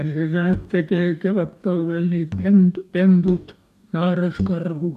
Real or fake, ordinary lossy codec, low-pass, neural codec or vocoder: fake; AAC, 96 kbps; 14.4 kHz; codec, 44.1 kHz, 2.6 kbps, DAC